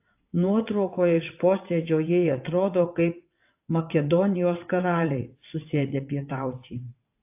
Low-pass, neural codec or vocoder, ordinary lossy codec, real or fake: 3.6 kHz; vocoder, 22.05 kHz, 80 mel bands, WaveNeXt; AAC, 32 kbps; fake